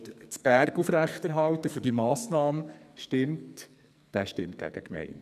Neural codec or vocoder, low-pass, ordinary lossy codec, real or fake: codec, 44.1 kHz, 2.6 kbps, SNAC; 14.4 kHz; none; fake